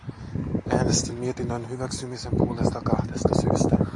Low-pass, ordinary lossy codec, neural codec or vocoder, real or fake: 10.8 kHz; AAC, 64 kbps; none; real